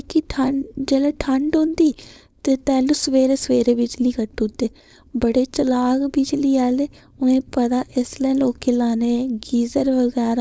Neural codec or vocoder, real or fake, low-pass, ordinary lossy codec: codec, 16 kHz, 4.8 kbps, FACodec; fake; none; none